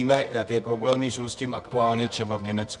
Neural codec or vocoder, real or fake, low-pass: codec, 24 kHz, 0.9 kbps, WavTokenizer, medium music audio release; fake; 10.8 kHz